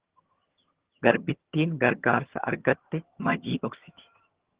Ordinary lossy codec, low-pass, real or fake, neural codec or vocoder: Opus, 16 kbps; 3.6 kHz; fake; vocoder, 22.05 kHz, 80 mel bands, HiFi-GAN